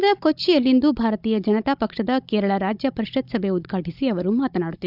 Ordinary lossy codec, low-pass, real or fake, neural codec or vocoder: none; 5.4 kHz; fake; codec, 16 kHz, 16 kbps, FunCodec, trained on Chinese and English, 50 frames a second